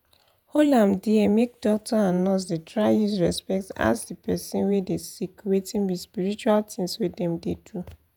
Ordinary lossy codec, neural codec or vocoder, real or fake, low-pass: none; vocoder, 48 kHz, 128 mel bands, Vocos; fake; none